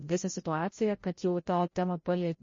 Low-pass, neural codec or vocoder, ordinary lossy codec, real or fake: 7.2 kHz; codec, 16 kHz, 0.5 kbps, FreqCodec, larger model; MP3, 32 kbps; fake